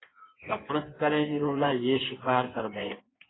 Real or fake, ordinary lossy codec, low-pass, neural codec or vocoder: fake; AAC, 16 kbps; 7.2 kHz; codec, 16 kHz in and 24 kHz out, 1.1 kbps, FireRedTTS-2 codec